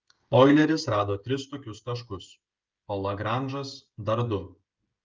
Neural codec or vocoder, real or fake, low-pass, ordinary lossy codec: codec, 16 kHz, 16 kbps, FreqCodec, smaller model; fake; 7.2 kHz; Opus, 24 kbps